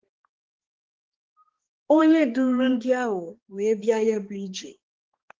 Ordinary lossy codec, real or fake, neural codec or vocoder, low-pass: Opus, 16 kbps; fake; codec, 16 kHz, 2 kbps, X-Codec, HuBERT features, trained on balanced general audio; 7.2 kHz